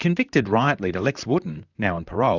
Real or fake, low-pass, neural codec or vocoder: fake; 7.2 kHz; vocoder, 44.1 kHz, 128 mel bands, Pupu-Vocoder